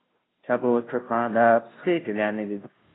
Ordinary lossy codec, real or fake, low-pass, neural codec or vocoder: AAC, 16 kbps; fake; 7.2 kHz; codec, 16 kHz, 0.5 kbps, FunCodec, trained on Chinese and English, 25 frames a second